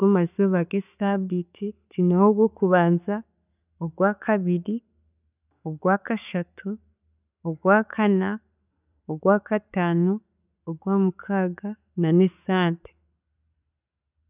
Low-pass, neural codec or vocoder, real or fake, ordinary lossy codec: 3.6 kHz; none; real; none